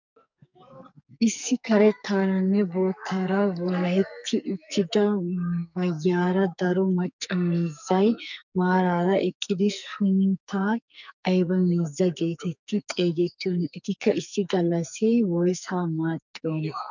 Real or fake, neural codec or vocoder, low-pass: fake; codec, 44.1 kHz, 2.6 kbps, SNAC; 7.2 kHz